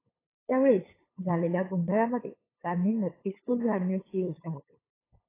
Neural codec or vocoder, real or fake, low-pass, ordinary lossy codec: codec, 16 kHz, 8 kbps, FunCodec, trained on LibriTTS, 25 frames a second; fake; 3.6 kHz; AAC, 16 kbps